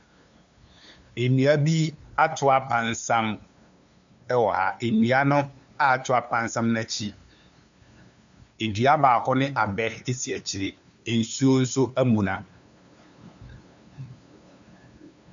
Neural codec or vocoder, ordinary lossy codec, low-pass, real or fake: codec, 16 kHz, 2 kbps, FunCodec, trained on LibriTTS, 25 frames a second; MP3, 64 kbps; 7.2 kHz; fake